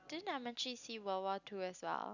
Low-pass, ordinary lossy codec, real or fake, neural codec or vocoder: 7.2 kHz; none; real; none